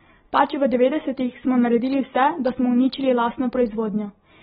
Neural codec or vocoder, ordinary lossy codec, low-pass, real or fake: none; AAC, 16 kbps; 19.8 kHz; real